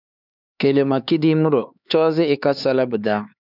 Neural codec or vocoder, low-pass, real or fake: codec, 16 kHz, 4 kbps, X-Codec, HuBERT features, trained on LibriSpeech; 5.4 kHz; fake